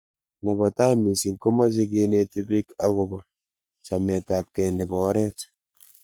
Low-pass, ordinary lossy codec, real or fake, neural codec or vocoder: none; none; fake; codec, 44.1 kHz, 3.4 kbps, Pupu-Codec